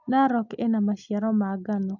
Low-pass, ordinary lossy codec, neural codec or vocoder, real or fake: 7.2 kHz; none; none; real